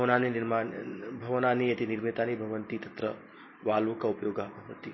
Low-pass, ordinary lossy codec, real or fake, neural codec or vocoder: 7.2 kHz; MP3, 24 kbps; real; none